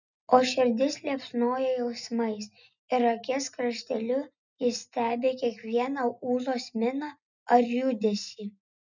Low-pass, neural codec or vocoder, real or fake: 7.2 kHz; none; real